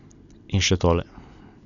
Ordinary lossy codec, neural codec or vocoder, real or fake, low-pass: none; none; real; 7.2 kHz